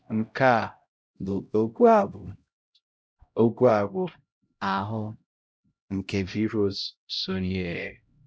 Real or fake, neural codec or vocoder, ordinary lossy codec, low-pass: fake; codec, 16 kHz, 0.5 kbps, X-Codec, HuBERT features, trained on LibriSpeech; none; none